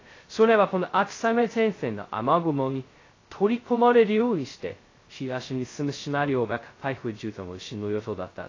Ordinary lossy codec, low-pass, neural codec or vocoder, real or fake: AAC, 32 kbps; 7.2 kHz; codec, 16 kHz, 0.2 kbps, FocalCodec; fake